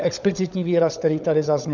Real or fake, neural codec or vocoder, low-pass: fake; codec, 16 kHz, 16 kbps, FunCodec, trained on LibriTTS, 50 frames a second; 7.2 kHz